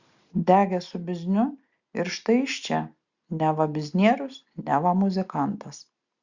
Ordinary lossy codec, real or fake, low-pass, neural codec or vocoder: Opus, 64 kbps; real; 7.2 kHz; none